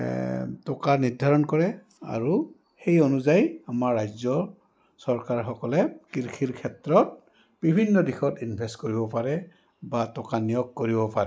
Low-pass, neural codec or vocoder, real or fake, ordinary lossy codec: none; none; real; none